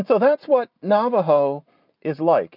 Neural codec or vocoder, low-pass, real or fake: none; 5.4 kHz; real